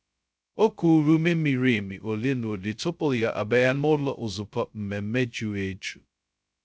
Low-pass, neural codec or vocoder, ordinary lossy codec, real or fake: none; codec, 16 kHz, 0.2 kbps, FocalCodec; none; fake